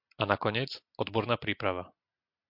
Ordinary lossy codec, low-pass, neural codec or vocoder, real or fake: MP3, 48 kbps; 5.4 kHz; none; real